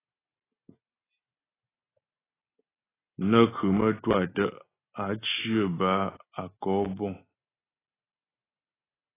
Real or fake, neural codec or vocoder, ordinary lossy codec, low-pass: real; none; AAC, 16 kbps; 3.6 kHz